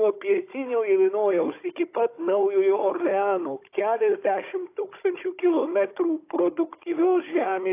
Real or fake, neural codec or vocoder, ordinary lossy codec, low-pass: fake; codec, 16 kHz, 16 kbps, FunCodec, trained on Chinese and English, 50 frames a second; AAC, 24 kbps; 3.6 kHz